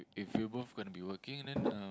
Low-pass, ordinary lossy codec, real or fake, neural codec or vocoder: none; none; real; none